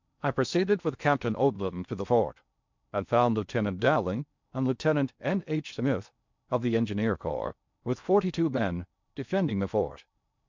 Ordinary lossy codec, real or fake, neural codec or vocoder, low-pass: MP3, 64 kbps; fake; codec, 16 kHz in and 24 kHz out, 0.6 kbps, FocalCodec, streaming, 4096 codes; 7.2 kHz